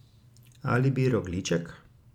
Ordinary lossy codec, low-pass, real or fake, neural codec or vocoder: none; 19.8 kHz; real; none